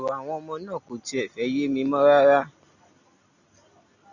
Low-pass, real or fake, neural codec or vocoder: 7.2 kHz; real; none